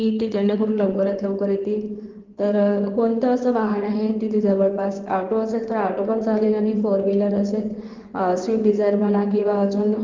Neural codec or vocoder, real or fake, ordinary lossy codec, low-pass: codec, 16 kHz in and 24 kHz out, 2.2 kbps, FireRedTTS-2 codec; fake; Opus, 16 kbps; 7.2 kHz